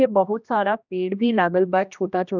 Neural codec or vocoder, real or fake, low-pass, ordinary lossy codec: codec, 16 kHz, 1 kbps, X-Codec, HuBERT features, trained on general audio; fake; 7.2 kHz; none